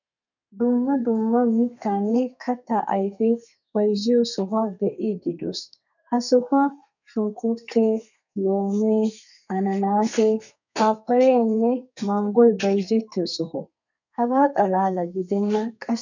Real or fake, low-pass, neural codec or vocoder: fake; 7.2 kHz; codec, 32 kHz, 1.9 kbps, SNAC